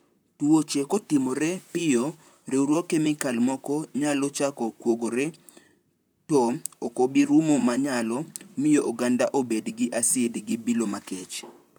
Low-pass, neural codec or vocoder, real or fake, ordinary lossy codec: none; vocoder, 44.1 kHz, 128 mel bands, Pupu-Vocoder; fake; none